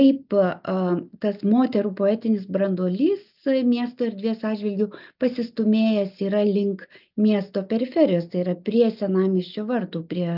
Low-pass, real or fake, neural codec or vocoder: 5.4 kHz; real; none